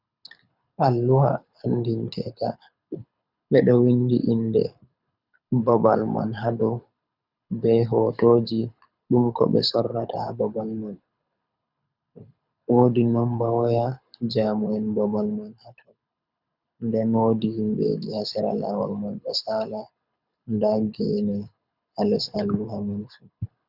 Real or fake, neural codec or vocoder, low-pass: fake; codec, 24 kHz, 6 kbps, HILCodec; 5.4 kHz